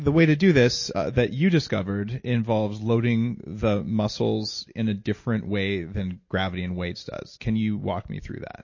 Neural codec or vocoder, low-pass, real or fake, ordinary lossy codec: none; 7.2 kHz; real; MP3, 32 kbps